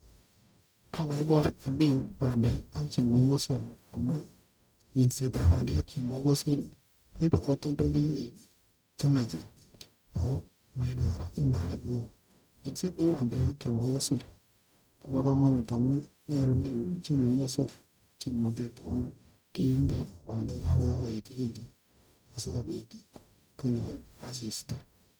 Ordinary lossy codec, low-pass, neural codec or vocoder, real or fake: none; none; codec, 44.1 kHz, 0.9 kbps, DAC; fake